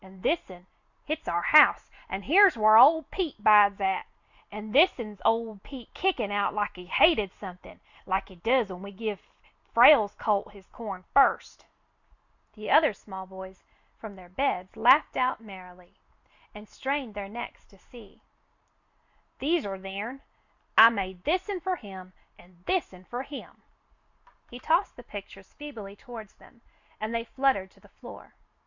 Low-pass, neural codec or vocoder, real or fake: 7.2 kHz; none; real